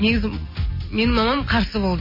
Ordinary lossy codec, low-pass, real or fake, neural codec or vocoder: MP3, 24 kbps; 5.4 kHz; real; none